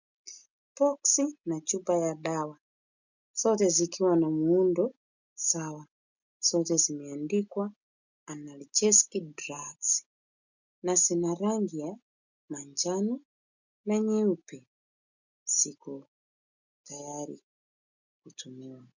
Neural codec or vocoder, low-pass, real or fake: none; 7.2 kHz; real